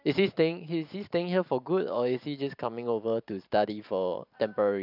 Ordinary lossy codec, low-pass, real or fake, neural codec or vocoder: none; 5.4 kHz; fake; vocoder, 44.1 kHz, 128 mel bands every 512 samples, BigVGAN v2